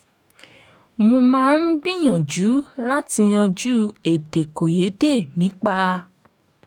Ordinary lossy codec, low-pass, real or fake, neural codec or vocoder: none; 19.8 kHz; fake; codec, 44.1 kHz, 2.6 kbps, DAC